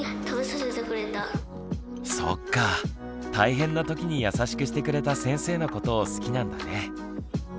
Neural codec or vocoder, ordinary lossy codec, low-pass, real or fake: none; none; none; real